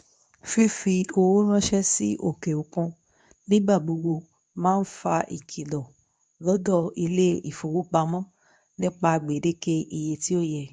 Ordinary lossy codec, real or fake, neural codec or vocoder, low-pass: none; fake; codec, 24 kHz, 0.9 kbps, WavTokenizer, medium speech release version 2; 10.8 kHz